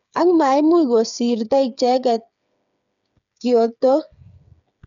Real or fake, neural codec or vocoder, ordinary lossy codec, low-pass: fake; codec, 16 kHz, 8 kbps, FreqCodec, smaller model; none; 7.2 kHz